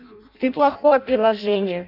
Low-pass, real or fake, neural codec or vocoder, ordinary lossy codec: 5.4 kHz; fake; codec, 16 kHz in and 24 kHz out, 0.6 kbps, FireRedTTS-2 codec; none